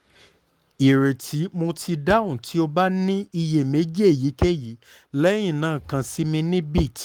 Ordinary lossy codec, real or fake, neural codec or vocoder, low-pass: Opus, 32 kbps; fake; codec, 44.1 kHz, 7.8 kbps, Pupu-Codec; 19.8 kHz